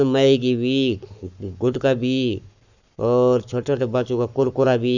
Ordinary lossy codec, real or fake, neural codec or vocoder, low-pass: none; fake; codec, 44.1 kHz, 7.8 kbps, Pupu-Codec; 7.2 kHz